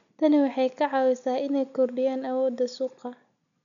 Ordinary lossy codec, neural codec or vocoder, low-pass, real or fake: MP3, 96 kbps; none; 7.2 kHz; real